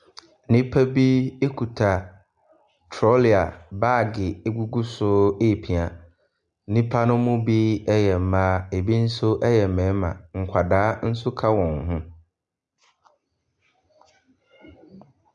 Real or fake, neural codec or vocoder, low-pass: real; none; 10.8 kHz